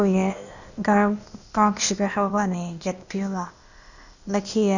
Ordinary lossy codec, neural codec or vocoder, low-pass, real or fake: none; codec, 16 kHz, 0.8 kbps, ZipCodec; 7.2 kHz; fake